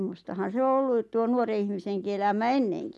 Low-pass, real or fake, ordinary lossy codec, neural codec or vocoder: none; real; none; none